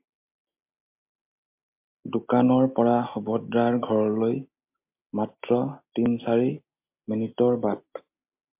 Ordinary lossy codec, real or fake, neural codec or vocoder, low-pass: MP3, 32 kbps; real; none; 3.6 kHz